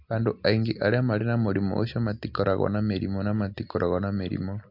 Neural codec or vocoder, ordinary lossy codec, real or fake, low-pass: none; MP3, 48 kbps; real; 5.4 kHz